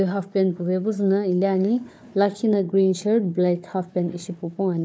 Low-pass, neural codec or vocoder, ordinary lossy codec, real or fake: none; codec, 16 kHz, 4 kbps, FunCodec, trained on Chinese and English, 50 frames a second; none; fake